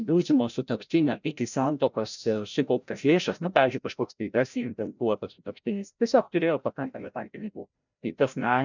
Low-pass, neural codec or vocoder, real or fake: 7.2 kHz; codec, 16 kHz, 0.5 kbps, FreqCodec, larger model; fake